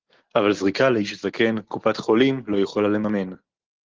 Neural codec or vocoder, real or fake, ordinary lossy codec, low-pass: none; real; Opus, 16 kbps; 7.2 kHz